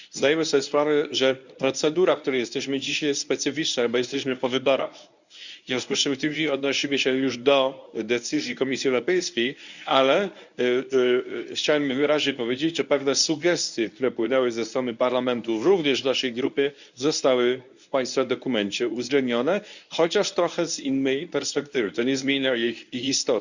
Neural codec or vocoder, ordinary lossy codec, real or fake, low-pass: codec, 24 kHz, 0.9 kbps, WavTokenizer, medium speech release version 1; none; fake; 7.2 kHz